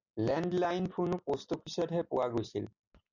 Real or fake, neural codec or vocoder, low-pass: real; none; 7.2 kHz